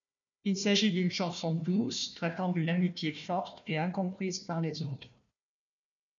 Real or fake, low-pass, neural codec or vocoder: fake; 7.2 kHz; codec, 16 kHz, 1 kbps, FunCodec, trained on Chinese and English, 50 frames a second